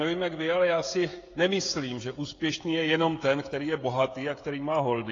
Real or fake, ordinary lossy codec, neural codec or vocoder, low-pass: fake; AAC, 32 kbps; codec, 16 kHz, 16 kbps, FreqCodec, smaller model; 7.2 kHz